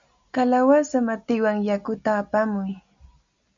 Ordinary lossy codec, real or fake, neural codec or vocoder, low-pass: MP3, 64 kbps; real; none; 7.2 kHz